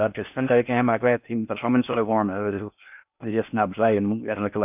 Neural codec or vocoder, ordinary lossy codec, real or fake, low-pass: codec, 16 kHz in and 24 kHz out, 0.6 kbps, FocalCodec, streaming, 4096 codes; none; fake; 3.6 kHz